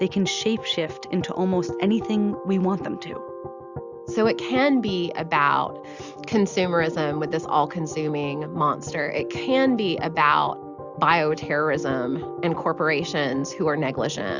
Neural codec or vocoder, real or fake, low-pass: none; real; 7.2 kHz